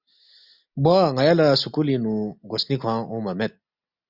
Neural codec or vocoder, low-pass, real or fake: none; 5.4 kHz; real